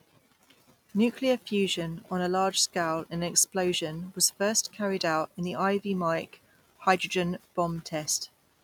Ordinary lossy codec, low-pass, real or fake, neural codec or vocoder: none; 19.8 kHz; real; none